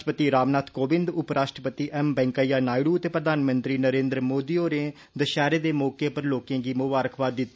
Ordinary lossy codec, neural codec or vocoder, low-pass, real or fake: none; none; none; real